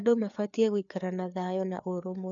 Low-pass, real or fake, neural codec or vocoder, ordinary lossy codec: 7.2 kHz; fake; codec, 16 kHz, 4 kbps, FreqCodec, larger model; none